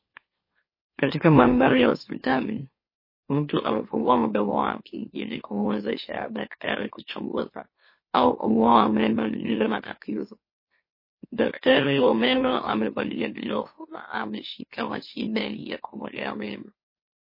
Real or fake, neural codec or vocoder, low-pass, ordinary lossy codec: fake; autoencoder, 44.1 kHz, a latent of 192 numbers a frame, MeloTTS; 5.4 kHz; MP3, 24 kbps